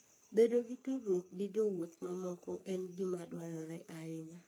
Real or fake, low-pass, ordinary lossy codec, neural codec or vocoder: fake; none; none; codec, 44.1 kHz, 3.4 kbps, Pupu-Codec